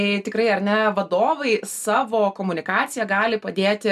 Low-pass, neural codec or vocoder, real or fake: 14.4 kHz; none; real